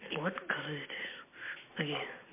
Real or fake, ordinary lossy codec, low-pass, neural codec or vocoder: real; MP3, 24 kbps; 3.6 kHz; none